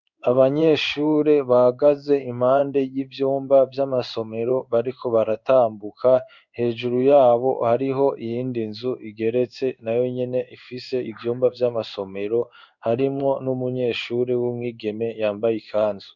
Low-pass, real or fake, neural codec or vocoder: 7.2 kHz; fake; codec, 16 kHz in and 24 kHz out, 1 kbps, XY-Tokenizer